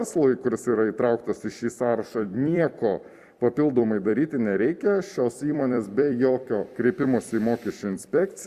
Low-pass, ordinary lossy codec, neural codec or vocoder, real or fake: 14.4 kHz; Opus, 64 kbps; vocoder, 44.1 kHz, 128 mel bands every 256 samples, BigVGAN v2; fake